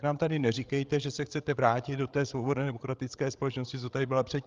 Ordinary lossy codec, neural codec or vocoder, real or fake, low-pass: Opus, 32 kbps; codec, 16 kHz, 16 kbps, FreqCodec, larger model; fake; 7.2 kHz